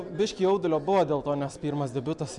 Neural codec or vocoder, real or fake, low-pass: none; real; 10.8 kHz